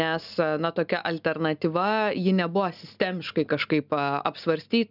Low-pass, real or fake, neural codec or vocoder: 5.4 kHz; real; none